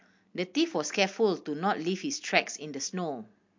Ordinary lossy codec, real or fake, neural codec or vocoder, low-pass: MP3, 48 kbps; real; none; 7.2 kHz